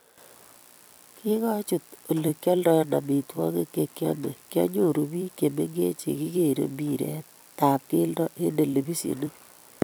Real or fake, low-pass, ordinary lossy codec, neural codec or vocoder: fake; none; none; vocoder, 44.1 kHz, 128 mel bands every 256 samples, BigVGAN v2